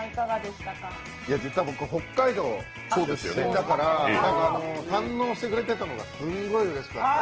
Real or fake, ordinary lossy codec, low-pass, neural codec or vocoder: real; Opus, 16 kbps; 7.2 kHz; none